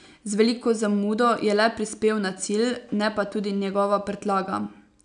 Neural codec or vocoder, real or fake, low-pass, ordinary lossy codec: none; real; 9.9 kHz; none